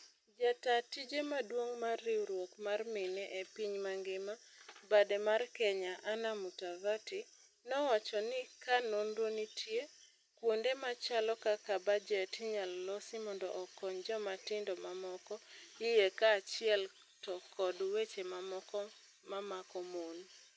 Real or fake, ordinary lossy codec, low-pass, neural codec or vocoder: real; none; none; none